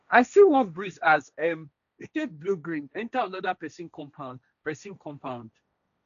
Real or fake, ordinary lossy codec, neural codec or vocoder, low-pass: fake; none; codec, 16 kHz, 1.1 kbps, Voila-Tokenizer; 7.2 kHz